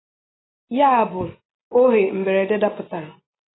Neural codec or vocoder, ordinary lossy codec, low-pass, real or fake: none; AAC, 16 kbps; 7.2 kHz; real